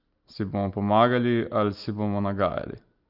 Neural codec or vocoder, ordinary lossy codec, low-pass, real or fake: none; Opus, 24 kbps; 5.4 kHz; real